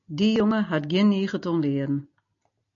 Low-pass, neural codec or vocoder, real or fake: 7.2 kHz; none; real